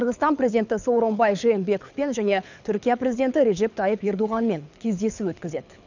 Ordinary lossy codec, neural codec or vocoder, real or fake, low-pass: none; codec, 24 kHz, 6 kbps, HILCodec; fake; 7.2 kHz